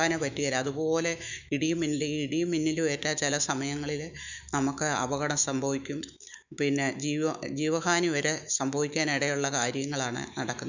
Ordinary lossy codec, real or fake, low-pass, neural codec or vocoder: none; fake; 7.2 kHz; autoencoder, 48 kHz, 128 numbers a frame, DAC-VAE, trained on Japanese speech